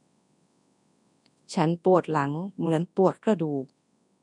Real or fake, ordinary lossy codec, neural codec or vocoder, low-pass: fake; MP3, 96 kbps; codec, 24 kHz, 0.9 kbps, WavTokenizer, large speech release; 10.8 kHz